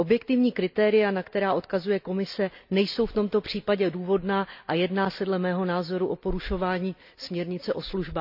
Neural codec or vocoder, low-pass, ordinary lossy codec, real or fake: none; 5.4 kHz; none; real